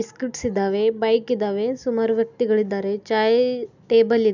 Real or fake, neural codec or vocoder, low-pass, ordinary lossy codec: real; none; 7.2 kHz; none